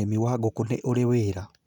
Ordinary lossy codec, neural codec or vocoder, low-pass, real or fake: none; none; 19.8 kHz; real